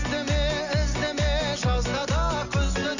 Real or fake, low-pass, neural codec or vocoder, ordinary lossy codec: real; 7.2 kHz; none; none